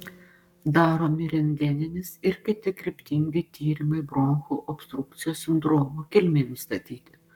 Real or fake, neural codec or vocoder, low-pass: fake; codec, 44.1 kHz, 7.8 kbps, Pupu-Codec; 19.8 kHz